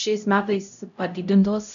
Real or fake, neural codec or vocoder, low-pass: fake; codec, 16 kHz, 0.5 kbps, X-Codec, HuBERT features, trained on LibriSpeech; 7.2 kHz